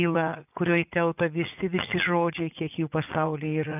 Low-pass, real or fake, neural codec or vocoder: 3.6 kHz; fake; vocoder, 44.1 kHz, 80 mel bands, Vocos